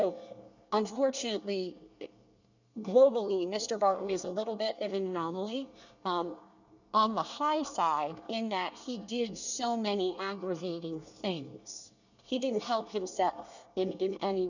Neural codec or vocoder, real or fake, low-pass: codec, 24 kHz, 1 kbps, SNAC; fake; 7.2 kHz